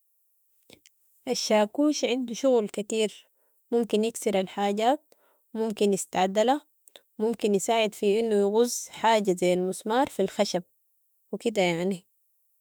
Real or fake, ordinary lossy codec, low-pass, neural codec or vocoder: fake; none; none; autoencoder, 48 kHz, 32 numbers a frame, DAC-VAE, trained on Japanese speech